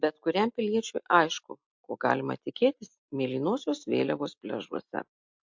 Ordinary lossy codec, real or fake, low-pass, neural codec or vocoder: MP3, 48 kbps; real; 7.2 kHz; none